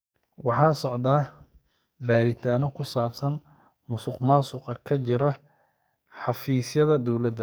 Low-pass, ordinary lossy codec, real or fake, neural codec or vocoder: none; none; fake; codec, 44.1 kHz, 2.6 kbps, SNAC